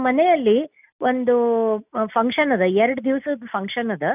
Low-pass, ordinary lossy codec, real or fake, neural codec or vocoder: 3.6 kHz; none; real; none